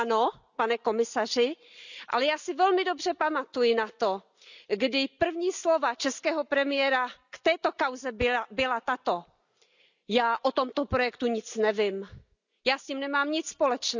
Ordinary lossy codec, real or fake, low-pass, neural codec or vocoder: none; real; 7.2 kHz; none